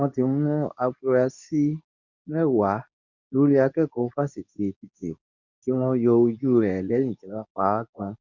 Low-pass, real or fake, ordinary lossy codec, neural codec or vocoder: 7.2 kHz; fake; none; codec, 24 kHz, 0.9 kbps, WavTokenizer, medium speech release version 2